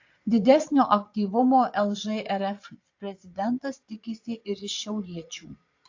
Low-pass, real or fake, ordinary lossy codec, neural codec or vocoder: 7.2 kHz; fake; AAC, 48 kbps; vocoder, 22.05 kHz, 80 mel bands, Vocos